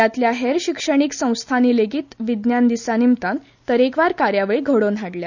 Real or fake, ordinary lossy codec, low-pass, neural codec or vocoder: real; none; 7.2 kHz; none